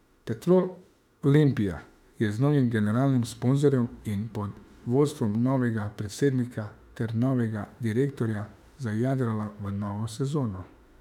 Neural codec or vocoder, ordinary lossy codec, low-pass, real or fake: autoencoder, 48 kHz, 32 numbers a frame, DAC-VAE, trained on Japanese speech; none; 19.8 kHz; fake